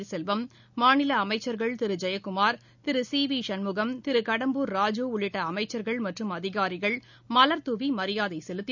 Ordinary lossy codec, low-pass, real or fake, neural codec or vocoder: none; 7.2 kHz; real; none